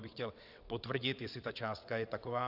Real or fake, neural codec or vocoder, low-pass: fake; vocoder, 22.05 kHz, 80 mel bands, WaveNeXt; 5.4 kHz